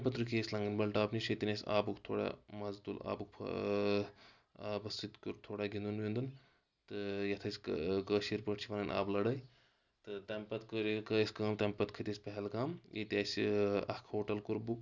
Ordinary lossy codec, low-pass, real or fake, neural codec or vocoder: none; 7.2 kHz; real; none